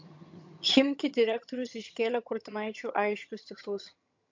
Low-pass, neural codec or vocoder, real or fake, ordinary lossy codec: 7.2 kHz; vocoder, 22.05 kHz, 80 mel bands, HiFi-GAN; fake; MP3, 48 kbps